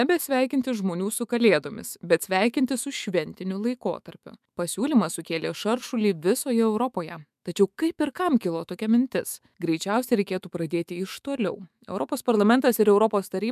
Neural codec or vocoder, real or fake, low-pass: autoencoder, 48 kHz, 128 numbers a frame, DAC-VAE, trained on Japanese speech; fake; 14.4 kHz